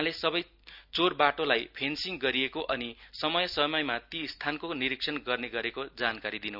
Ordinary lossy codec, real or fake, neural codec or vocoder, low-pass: none; real; none; 5.4 kHz